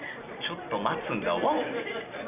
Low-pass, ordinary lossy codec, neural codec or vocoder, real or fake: 3.6 kHz; none; none; real